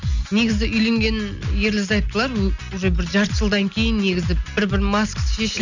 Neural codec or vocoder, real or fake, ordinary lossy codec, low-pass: none; real; none; 7.2 kHz